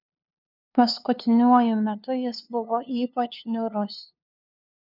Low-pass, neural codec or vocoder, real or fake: 5.4 kHz; codec, 16 kHz, 2 kbps, FunCodec, trained on LibriTTS, 25 frames a second; fake